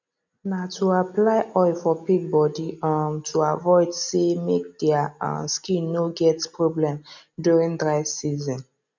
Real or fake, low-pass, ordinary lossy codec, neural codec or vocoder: real; 7.2 kHz; none; none